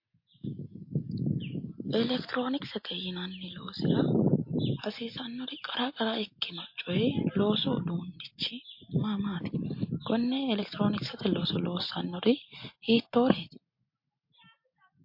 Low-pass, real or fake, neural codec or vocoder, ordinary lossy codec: 5.4 kHz; real; none; MP3, 32 kbps